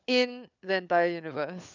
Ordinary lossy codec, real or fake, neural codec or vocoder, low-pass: none; fake; codec, 16 kHz, 4 kbps, FunCodec, trained on LibriTTS, 50 frames a second; 7.2 kHz